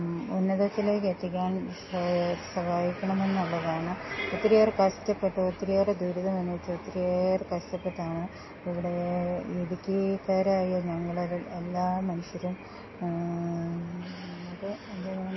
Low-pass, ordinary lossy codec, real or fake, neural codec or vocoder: 7.2 kHz; MP3, 24 kbps; real; none